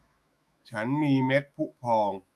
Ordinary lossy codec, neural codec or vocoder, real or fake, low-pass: none; autoencoder, 48 kHz, 128 numbers a frame, DAC-VAE, trained on Japanese speech; fake; 14.4 kHz